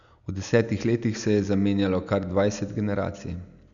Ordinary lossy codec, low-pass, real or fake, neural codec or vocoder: none; 7.2 kHz; real; none